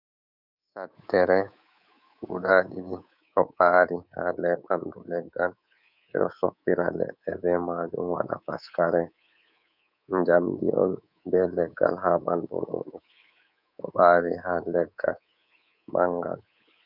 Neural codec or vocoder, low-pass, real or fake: codec, 24 kHz, 3.1 kbps, DualCodec; 5.4 kHz; fake